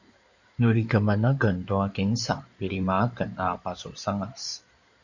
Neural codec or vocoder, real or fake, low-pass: codec, 16 kHz in and 24 kHz out, 2.2 kbps, FireRedTTS-2 codec; fake; 7.2 kHz